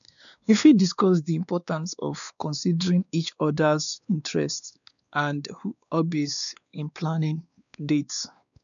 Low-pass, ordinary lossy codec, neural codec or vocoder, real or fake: 7.2 kHz; none; codec, 16 kHz, 2 kbps, X-Codec, WavLM features, trained on Multilingual LibriSpeech; fake